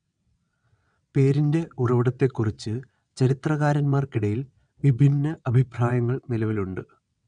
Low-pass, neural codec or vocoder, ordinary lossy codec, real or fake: 9.9 kHz; vocoder, 22.05 kHz, 80 mel bands, WaveNeXt; none; fake